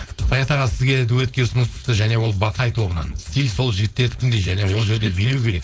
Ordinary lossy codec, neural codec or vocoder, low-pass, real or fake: none; codec, 16 kHz, 4.8 kbps, FACodec; none; fake